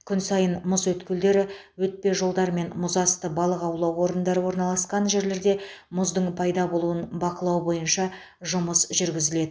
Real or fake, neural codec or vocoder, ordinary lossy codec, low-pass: real; none; none; none